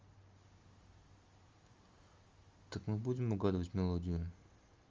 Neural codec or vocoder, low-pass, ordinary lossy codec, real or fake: none; 7.2 kHz; Opus, 32 kbps; real